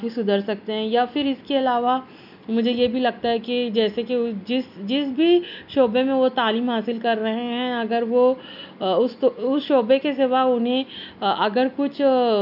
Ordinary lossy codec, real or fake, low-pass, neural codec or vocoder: none; real; 5.4 kHz; none